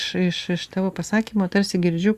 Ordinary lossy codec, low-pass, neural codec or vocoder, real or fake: Opus, 64 kbps; 14.4 kHz; none; real